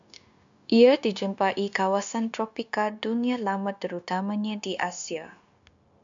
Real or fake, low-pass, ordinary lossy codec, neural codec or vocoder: fake; 7.2 kHz; MP3, 64 kbps; codec, 16 kHz, 0.9 kbps, LongCat-Audio-Codec